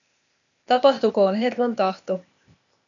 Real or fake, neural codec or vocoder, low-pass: fake; codec, 16 kHz, 0.8 kbps, ZipCodec; 7.2 kHz